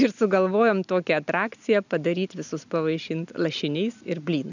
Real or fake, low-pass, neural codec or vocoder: real; 7.2 kHz; none